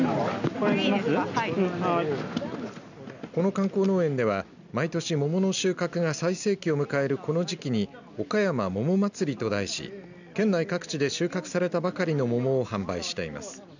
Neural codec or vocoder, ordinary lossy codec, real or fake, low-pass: none; none; real; 7.2 kHz